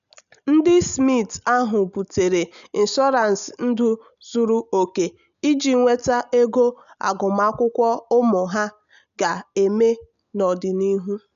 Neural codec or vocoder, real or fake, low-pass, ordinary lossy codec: none; real; 7.2 kHz; none